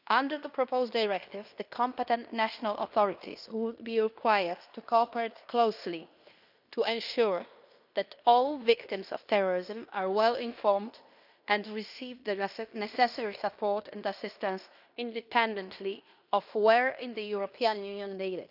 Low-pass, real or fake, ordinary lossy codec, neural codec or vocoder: 5.4 kHz; fake; none; codec, 16 kHz in and 24 kHz out, 0.9 kbps, LongCat-Audio-Codec, fine tuned four codebook decoder